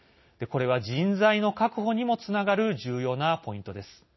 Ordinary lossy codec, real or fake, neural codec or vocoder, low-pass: MP3, 24 kbps; real; none; 7.2 kHz